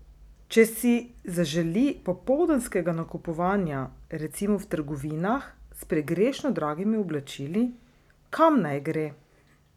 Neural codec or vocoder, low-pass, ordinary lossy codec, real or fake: none; 19.8 kHz; none; real